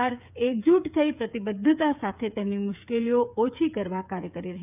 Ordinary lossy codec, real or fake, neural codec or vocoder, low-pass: none; fake; codec, 16 kHz, 8 kbps, FreqCodec, smaller model; 3.6 kHz